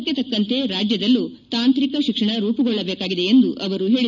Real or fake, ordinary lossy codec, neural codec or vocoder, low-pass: real; none; none; 7.2 kHz